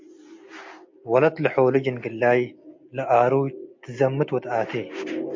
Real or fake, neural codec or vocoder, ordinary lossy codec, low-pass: real; none; MP3, 48 kbps; 7.2 kHz